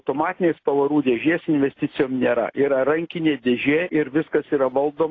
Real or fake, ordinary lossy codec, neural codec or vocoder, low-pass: real; AAC, 32 kbps; none; 7.2 kHz